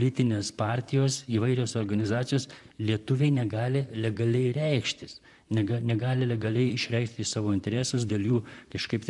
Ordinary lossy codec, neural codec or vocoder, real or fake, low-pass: MP3, 96 kbps; vocoder, 44.1 kHz, 128 mel bands, Pupu-Vocoder; fake; 10.8 kHz